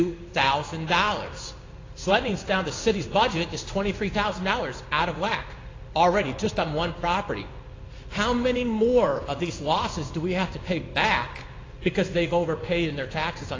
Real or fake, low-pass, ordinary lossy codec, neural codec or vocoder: fake; 7.2 kHz; AAC, 32 kbps; codec, 16 kHz in and 24 kHz out, 1 kbps, XY-Tokenizer